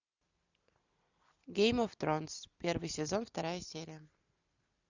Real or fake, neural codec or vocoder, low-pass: real; none; 7.2 kHz